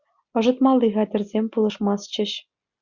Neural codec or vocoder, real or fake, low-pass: none; real; 7.2 kHz